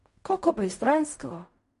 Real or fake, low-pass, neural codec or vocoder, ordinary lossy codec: fake; 10.8 kHz; codec, 16 kHz in and 24 kHz out, 0.4 kbps, LongCat-Audio-Codec, fine tuned four codebook decoder; MP3, 48 kbps